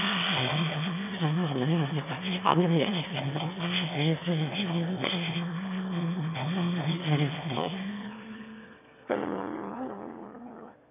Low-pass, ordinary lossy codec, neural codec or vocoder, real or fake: 3.6 kHz; none; autoencoder, 22.05 kHz, a latent of 192 numbers a frame, VITS, trained on one speaker; fake